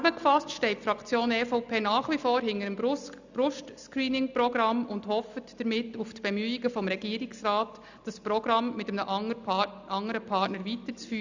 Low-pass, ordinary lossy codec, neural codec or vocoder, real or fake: 7.2 kHz; none; none; real